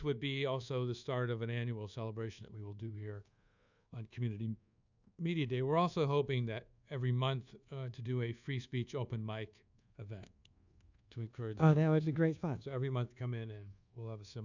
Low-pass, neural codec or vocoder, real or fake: 7.2 kHz; codec, 24 kHz, 1.2 kbps, DualCodec; fake